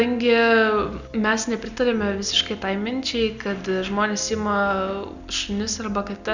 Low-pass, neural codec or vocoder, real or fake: 7.2 kHz; none; real